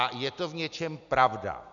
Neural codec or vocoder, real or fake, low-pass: none; real; 7.2 kHz